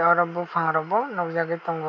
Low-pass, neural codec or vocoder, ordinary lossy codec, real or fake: 7.2 kHz; none; none; real